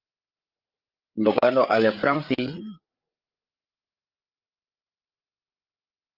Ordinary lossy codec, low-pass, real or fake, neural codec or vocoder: Opus, 32 kbps; 5.4 kHz; fake; codec, 16 kHz, 8 kbps, FreqCodec, larger model